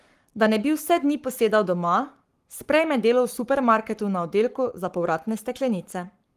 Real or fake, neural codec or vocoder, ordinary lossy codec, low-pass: fake; codec, 44.1 kHz, 7.8 kbps, Pupu-Codec; Opus, 24 kbps; 14.4 kHz